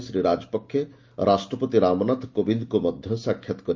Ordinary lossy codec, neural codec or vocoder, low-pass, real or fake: Opus, 24 kbps; none; 7.2 kHz; real